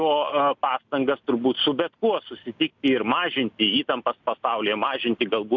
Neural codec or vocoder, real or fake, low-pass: none; real; 7.2 kHz